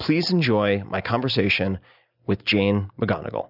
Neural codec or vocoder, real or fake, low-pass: none; real; 5.4 kHz